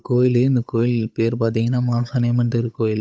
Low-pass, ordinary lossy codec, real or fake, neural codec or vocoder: none; none; fake; codec, 16 kHz, 8 kbps, FunCodec, trained on Chinese and English, 25 frames a second